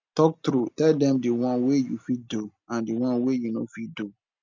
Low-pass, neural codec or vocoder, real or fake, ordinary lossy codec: 7.2 kHz; none; real; MP3, 64 kbps